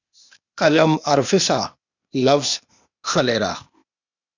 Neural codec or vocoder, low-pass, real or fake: codec, 16 kHz, 0.8 kbps, ZipCodec; 7.2 kHz; fake